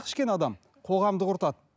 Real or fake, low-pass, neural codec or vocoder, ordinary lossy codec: real; none; none; none